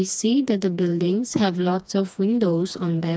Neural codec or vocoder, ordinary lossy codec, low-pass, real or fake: codec, 16 kHz, 2 kbps, FreqCodec, smaller model; none; none; fake